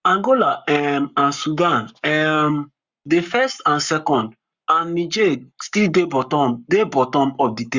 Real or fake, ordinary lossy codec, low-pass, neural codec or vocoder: fake; Opus, 64 kbps; 7.2 kHz; codec, 44.1 kHz, 7.8 kbps, Pupu-Codec